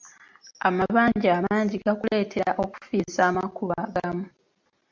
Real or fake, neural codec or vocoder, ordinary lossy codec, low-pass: real; none; MP3, 64 kbps; 7.2 kHz